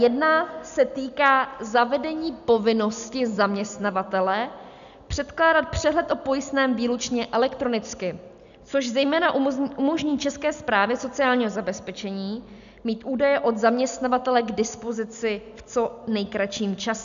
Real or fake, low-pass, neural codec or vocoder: real; 7.2 kHz; none